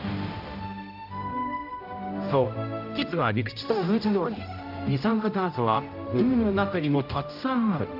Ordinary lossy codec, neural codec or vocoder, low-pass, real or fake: AAC, 48 kbps; codec, 16 kHz, 0.5 kbps, X-Codec, HuBERT features, trained on general audio; 5.4 kHz; fake